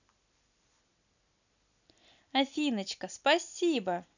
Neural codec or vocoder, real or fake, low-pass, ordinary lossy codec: none; real; 7.2 kHz; none